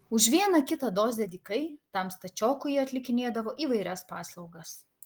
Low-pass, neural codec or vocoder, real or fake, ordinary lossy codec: 19.8 kHz; none; real; Opus, 24 kbps